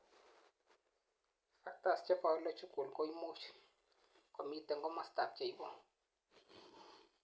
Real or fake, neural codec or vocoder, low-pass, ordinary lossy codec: real; none; none; none